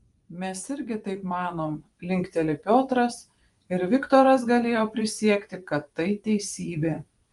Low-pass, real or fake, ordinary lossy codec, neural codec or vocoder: 10.8 kHz; fake; Opus, 32 kbps; vocoder, 24 kHz, 100 mel bands, Vocos